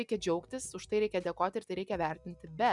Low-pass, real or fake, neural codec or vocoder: 10.8 kHz; real; none